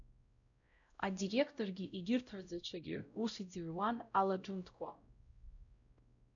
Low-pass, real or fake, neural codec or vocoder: 7.2 kHz; fake; codec, 16 kHz, 0.5 kbps, X-Codec, WavLM features, trained on Multilingual LibriSpeech